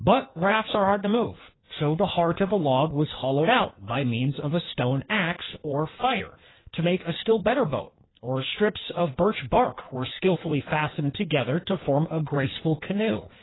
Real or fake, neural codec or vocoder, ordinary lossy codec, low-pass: fake; codec, 16 kHz in and 24 kHz out, 1.1 kbps, FireRedTTS-2 codec; AAC, 16 kbps; 7.2 kHz